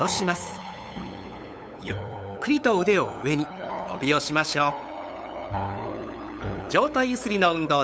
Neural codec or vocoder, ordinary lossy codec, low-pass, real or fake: codec, 16 kHz, 8 kbps, FunCodec, trained on LibriTTS, 25 frames a second; none; none; fake